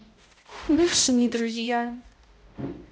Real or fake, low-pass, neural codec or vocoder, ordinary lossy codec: fake; none; codec, 16 kHz, 0.5 kbps, X-Codec, HuBERT features, trained on balanced general audio; none